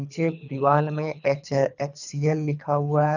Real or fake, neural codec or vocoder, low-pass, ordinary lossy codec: fake; codec, 24 kHz, 3 kbps, HILCodec; 7.2 kHz; none